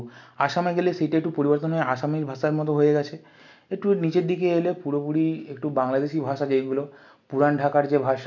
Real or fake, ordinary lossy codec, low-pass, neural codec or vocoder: real; none; 7.2 kHz; none